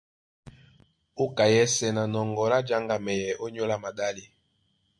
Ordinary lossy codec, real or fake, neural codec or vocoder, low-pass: MP3, 48 kbps; real; none; 9.9 kHz